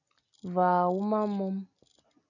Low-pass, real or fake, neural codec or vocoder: 7.2 kHz; real; none